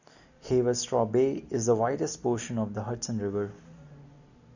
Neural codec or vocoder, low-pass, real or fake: none; 7.2 kHz; real